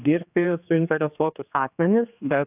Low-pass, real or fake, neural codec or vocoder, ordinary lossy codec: 3.6 kHz; fake; codec, 16 kHz, 2 kbps, X-Codec, HuBERT features, trained on general audio; AAC, 32 kbps